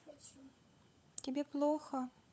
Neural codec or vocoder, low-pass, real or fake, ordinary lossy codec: codec, 16 kHz, 16 kbps, FunCodec, trained on LibriTTS, 50 frames a second; none; fake; none